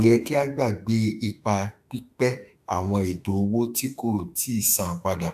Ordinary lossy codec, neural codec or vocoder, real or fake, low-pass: AAC, 96 kbps; autoencoder, 48 kHz, 32 numbers a frame, DAC-VAE, trained on Japanese speech; fake; 14.4 kHz